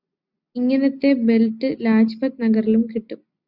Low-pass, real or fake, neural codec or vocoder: 5.4 kHz; real; none